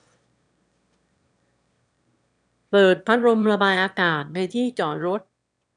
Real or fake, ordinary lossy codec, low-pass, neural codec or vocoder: fake; none; 9.9 kHz; autoencoder, 22.05 kHz, a latent of 192 numbers a frame, VITS, trained on one speaker